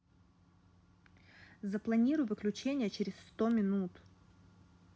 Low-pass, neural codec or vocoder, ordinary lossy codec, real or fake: none; none; none; real